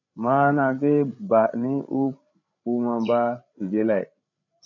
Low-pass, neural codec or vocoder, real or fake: 7.2 kHz; codec, 16 kHz, 8 kbps, FreqCodec, larger model; fake